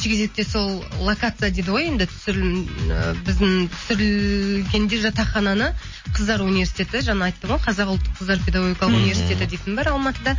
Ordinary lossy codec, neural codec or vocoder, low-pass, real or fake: MP3, 32 kbps; none; 7.2 kHz; real